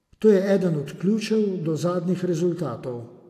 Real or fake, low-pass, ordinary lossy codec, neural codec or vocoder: real; 14.4 kHz; AAC, 64 kbps; none